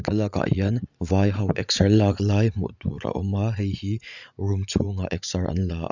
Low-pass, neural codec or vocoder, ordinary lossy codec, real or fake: 7.2 kHz; vocoder, 44.1 kHz, 80 mel bands, Vocos; none; fake